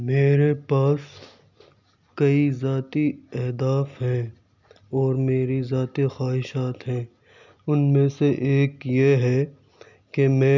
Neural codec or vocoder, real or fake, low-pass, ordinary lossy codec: none; real; 7.2 kHz; none